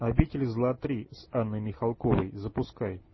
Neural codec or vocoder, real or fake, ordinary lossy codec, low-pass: none; real; MP3, 24 kbps; 7.2 kHz